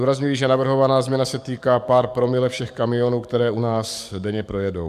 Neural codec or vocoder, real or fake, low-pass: codec, 44.1 kHz, 7.8 kbps, DAC; fake; 14.4 kHz